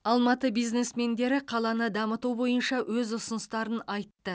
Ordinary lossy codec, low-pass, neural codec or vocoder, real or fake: none; none; none; real